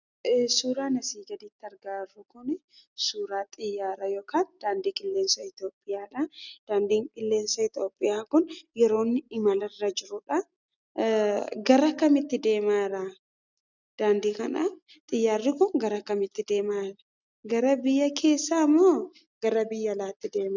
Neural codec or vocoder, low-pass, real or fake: none; 7.2 kHz; real